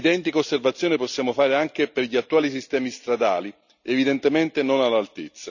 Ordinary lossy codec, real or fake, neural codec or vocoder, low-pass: none; real; none; 7.2 kHz